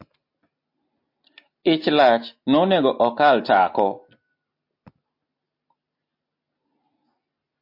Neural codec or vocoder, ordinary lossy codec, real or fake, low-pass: none; MP3, 32 kbps; real; 5.4 kHz